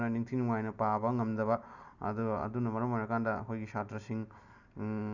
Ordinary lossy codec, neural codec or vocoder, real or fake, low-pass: none; none; real; 7.2 kHz